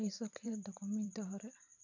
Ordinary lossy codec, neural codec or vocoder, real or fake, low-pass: none; vocoder, 44.1 kHz, 128 mel bands every 512 samples, BigVGAN v2; fake; 7.2 kHz